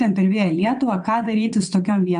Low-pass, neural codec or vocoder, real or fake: 9.9 kHz; vocoder, 22.05 kHz, 80 mel bands, WaveNeXt; fake